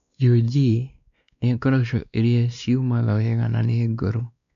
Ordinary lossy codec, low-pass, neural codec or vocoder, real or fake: none; 7.2 kHz; codec, 16 kHz, 2 kbps, X-Codec, WavLM features, trained on Multilingual LibriSpeech; fake